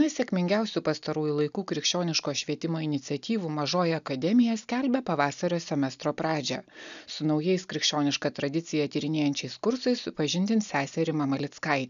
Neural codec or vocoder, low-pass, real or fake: none; 7.2 kHz; real